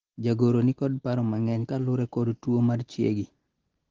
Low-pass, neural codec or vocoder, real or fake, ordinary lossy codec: 7.2 kHz; none; real; Opus, 16 kbps